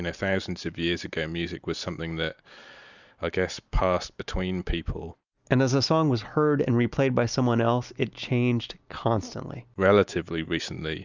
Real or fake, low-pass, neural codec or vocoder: real; 7.2 kHz; none